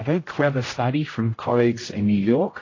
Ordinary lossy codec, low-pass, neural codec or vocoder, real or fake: AAC, 32 kbps; 7.2 kHz; codec, 16 kHz, 0.5 kbps, X-Codec, HuBERT features, trained on general audio; fake